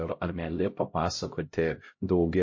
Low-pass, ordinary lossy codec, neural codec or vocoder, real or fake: 7.2 kHz; MP3, 32 kbps; codec, 16 kHz, 0.5 kbps, X-Codec, HuBERT features, trained on LibriSpeech; fake